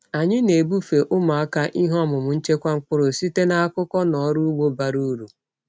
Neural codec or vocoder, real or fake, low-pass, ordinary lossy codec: none; real; none; none